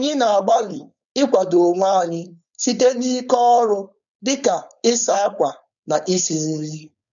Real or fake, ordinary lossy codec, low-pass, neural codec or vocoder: fake; MP3, 96 kbps; 7.2 kHz; codec, 16 kHz, 4.8 kbps, FACodec